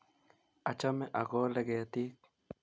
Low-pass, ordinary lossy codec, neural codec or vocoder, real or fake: none; none; none; real